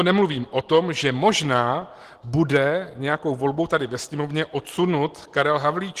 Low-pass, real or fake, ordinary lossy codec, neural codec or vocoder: 14.4 kHz; real; Opus, 16 kbps; none